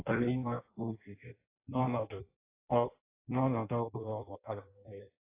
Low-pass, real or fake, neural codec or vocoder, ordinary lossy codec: 3.6 kHz; fake; codec, 16 kHz in and 24 kHz out, 0.6 kbps, FireRedTTS-2 codec; AAC, 24 kbps